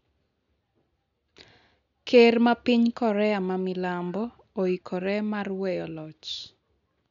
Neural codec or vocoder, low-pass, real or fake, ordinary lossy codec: none; 7.2 kHz; real; none